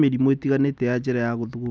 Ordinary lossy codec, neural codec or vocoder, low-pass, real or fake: none; none; none; real